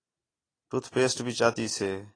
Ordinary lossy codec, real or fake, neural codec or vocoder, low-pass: AAC, 32 kbps; real; none; 9.9 kHz